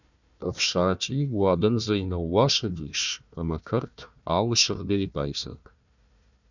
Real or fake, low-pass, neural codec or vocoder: fake; 7.2 kHz; codec, 16 kHz, 1 kbps, FunCodec, trained on Chinese and English, 50 frames a second